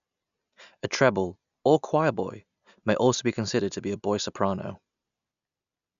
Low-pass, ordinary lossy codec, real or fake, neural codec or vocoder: 7.2 kHz; none; real; none